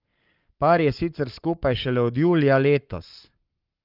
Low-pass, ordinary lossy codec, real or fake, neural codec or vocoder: 5.4 kHz; Opus, 32 kbps; fake; codec, 44.1 kHz, 7.8 kbps, Pupu-Codec